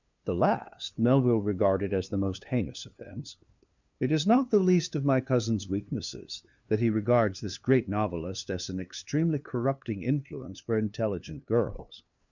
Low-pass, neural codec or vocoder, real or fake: 7.2 kHz; codec, 16 kHz, 2 kbps, FunCodec, trained on LibriTTS, 25 frames a second; fake